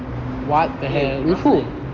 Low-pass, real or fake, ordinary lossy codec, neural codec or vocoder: 7.2 kHz; real; Opus, 32 kbps; none